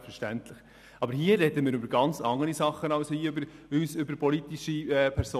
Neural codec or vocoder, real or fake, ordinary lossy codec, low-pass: none; real; none; 14.4 kHz